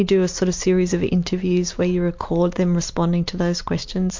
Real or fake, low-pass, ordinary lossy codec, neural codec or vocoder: real; 7.2 kHz; MP3, 48 kbps; none